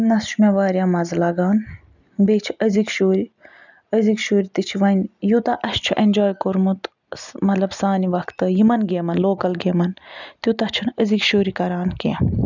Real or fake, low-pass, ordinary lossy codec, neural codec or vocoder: real; 7.2 kHz; none; none